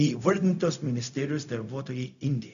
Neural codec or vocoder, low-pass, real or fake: codec, 16 kHz, 0.4 kbps, LongCat-Audio-Codec; 7.2 kHz; fake